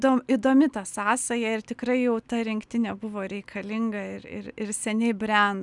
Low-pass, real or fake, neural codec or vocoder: 10.8 kHz; real; none